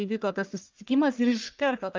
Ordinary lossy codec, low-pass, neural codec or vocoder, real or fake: Opus, 32 kbps; 7.2 kHz; codec, 44.1 kHz, 1.7 kbps, Pupu-Codec; fake